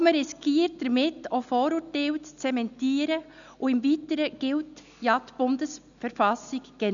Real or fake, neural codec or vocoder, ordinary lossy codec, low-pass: real; none; none; 7.2 kHz